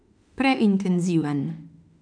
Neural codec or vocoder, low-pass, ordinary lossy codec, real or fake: autoencoder, 48 kHz, 32 numbers a frame, DAC-VAE, trained on Japanese speech; 9.9 kHz; none; fake